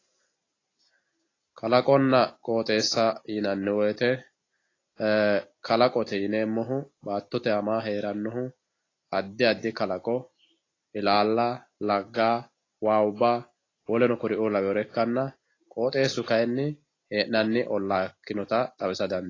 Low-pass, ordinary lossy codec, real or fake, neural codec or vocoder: 7.2 kHz; AAC, 32 kbps; real; none